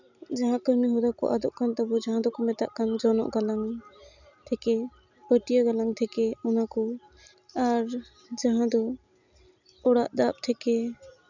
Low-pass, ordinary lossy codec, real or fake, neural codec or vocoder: 7.2 kHz; none; real; none